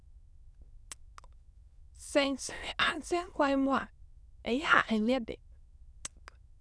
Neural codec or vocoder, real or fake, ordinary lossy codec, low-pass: autoencoder, 22.05 kHz, a latent of 192 numbers a frame, VITS, trained on many speakers; fake; none; none